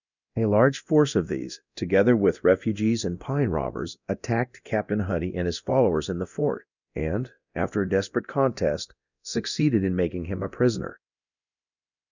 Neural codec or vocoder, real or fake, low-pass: codec, 24 kHz, 0.9 kbps, DualCodec; fake; 7.2 kHz